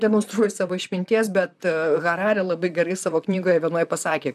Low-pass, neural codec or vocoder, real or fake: 14.4 kHz; vocoder, 44.1 kHz, 128 mel bands, Pupu-Vocoder; fake